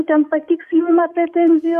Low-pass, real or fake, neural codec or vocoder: 14.4 kHz; fake; codec, 44.1 kHz, 7.8 kbps, DAC